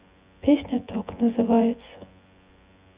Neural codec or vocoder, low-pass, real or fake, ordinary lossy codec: vocoder, 24 kHz, 100 mel bands, Vocos; 3.6 kHz; fake; Opus, 64 kbps